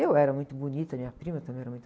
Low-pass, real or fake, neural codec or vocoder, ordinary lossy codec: none; real; none; none